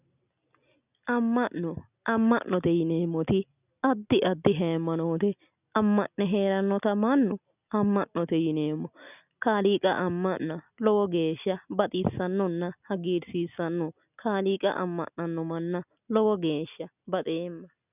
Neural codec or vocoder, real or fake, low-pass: none; real; 3.6 kHz